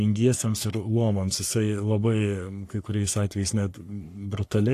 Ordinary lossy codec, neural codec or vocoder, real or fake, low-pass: AAC, 64 kbps; codec, 44.1 kHz, 3.4 kbps, Pupu-Codec; fake; 14.4 kHz